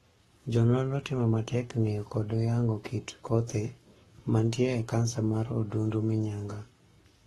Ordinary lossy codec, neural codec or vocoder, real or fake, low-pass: AAC, 32 kbps; codec, 44.1 kHz, 7.8 kbps, Pupu-Codec; fake; 19.8 kHz